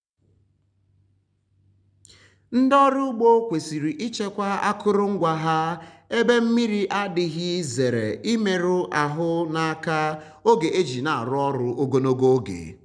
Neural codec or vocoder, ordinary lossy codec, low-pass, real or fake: none; none; 9.9 kHz; real